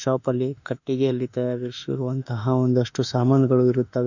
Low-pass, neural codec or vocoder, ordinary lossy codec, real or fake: 7.2 kHz; autoencoder, 48 kHz, 32 numbers a frame, DAC-VAE, trained on Japanese speech; none; fake